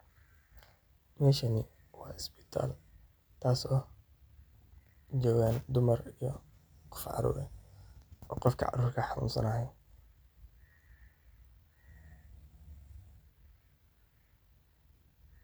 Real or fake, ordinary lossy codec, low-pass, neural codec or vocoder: real; none; none; none